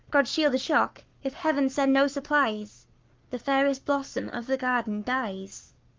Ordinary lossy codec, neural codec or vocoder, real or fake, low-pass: Opus, 24 kbps; codec, 44.1 kHz, 7.8 kbps, Pupu-Codec; fake; 7.2 kHz